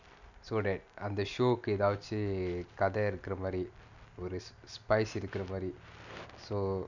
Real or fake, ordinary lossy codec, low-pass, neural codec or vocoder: real; none; 7.2 kHz; none